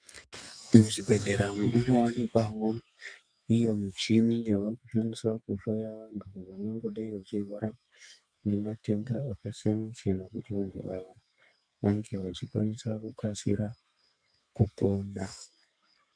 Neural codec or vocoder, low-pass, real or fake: codec, 32 kHz, 1.9 kbps, SNAC; 9.9 kHz; fake